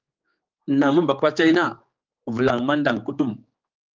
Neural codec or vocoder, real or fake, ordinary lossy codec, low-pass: codec, 16 kHz, 4 kbps, X-Codec, HuBERT features, trained on general audio; fake; Opus, 24 kbps; 7.2 kHz